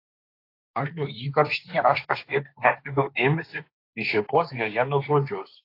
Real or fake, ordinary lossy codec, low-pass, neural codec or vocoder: fake; AAC, 32 kbps; 5.4 kHz; codec, 16 kHz, 1.1 kbps, Voila-Tokenizer